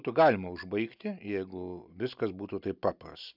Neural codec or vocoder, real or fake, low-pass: none; real; 5.4 kHz